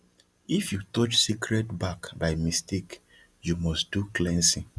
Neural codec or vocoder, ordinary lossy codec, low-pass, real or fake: none; none; none; real